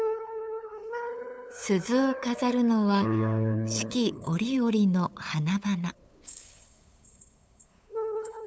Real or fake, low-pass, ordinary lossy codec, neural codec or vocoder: fake; none; none; codec, 16 kHz, 8 kbps, FunCodec, trained on LibriTTS, 25 frames a second